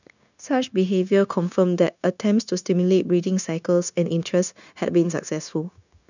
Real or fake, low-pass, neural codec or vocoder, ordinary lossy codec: fake; 7.2 kHz; codec, 16 kHz, 0.9 kbps, LongCat-Audio-Codec; none